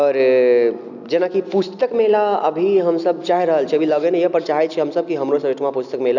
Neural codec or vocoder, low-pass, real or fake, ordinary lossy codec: none; 7.2 kHz; real; none